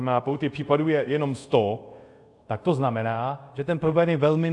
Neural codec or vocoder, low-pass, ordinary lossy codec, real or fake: codec, 24 kHz, 0.5 kbps, DualCodec; 10.8 kHz; AAC, 64 kbps; fake